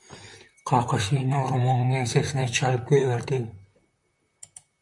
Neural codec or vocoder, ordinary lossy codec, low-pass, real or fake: vocoder, 44.1 kHz, 128 mel bands, Pupu-Vocoder; MP3, 64 kbps; 10.8 kHz; fake